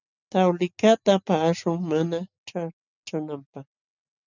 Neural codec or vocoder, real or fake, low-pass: none; real; 7.2 kHz